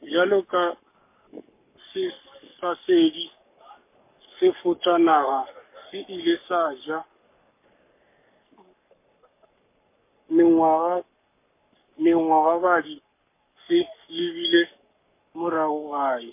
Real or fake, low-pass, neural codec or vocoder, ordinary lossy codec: fake; 3.6 kHz; codec, 44.1 kHz, 7.8 kbps, Pupu-Codec; MP3, 24 kbps